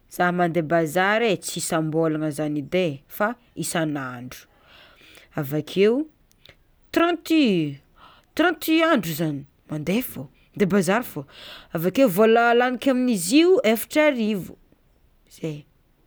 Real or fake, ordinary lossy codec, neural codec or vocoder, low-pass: real; none; none; none